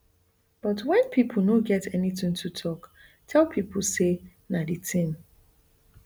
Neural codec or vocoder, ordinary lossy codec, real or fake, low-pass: none; none; real; none